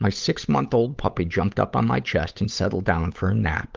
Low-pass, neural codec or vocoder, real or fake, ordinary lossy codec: 7.2 kHz; codec, 16 kHz, 8 kbps, FunCodec, trained on Chinese and English, 25 frames a second; fake; Opus, 24 kbps